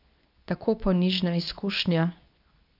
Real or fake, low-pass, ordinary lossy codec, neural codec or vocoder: fake; 5.4 kHz; none; codec, 24 kHz, 0.9 kbps, WavTokenizer, medium speech release version 2